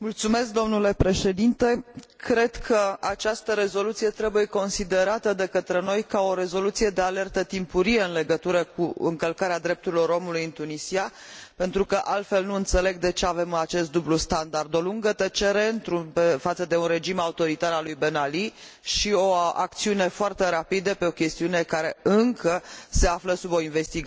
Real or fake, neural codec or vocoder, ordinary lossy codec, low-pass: real; none; none; none